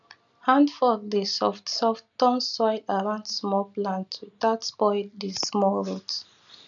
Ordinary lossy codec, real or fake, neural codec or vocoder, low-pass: none; real; none; 7.2 kHz